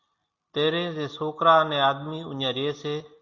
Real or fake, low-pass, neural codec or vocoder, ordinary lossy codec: real; 7.2 kHz; none; Opus, 64 kbps